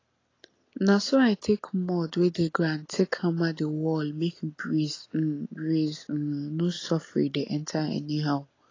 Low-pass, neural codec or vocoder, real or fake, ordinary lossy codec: 7.2 kHz; none; real; AAC, 32 kbps